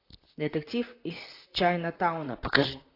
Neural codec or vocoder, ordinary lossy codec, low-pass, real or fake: vocoder, 44.1 kHz, 128 mel bands, Pupu-Vocoder; AAC, 32 kbps; 5.4 kHz; fake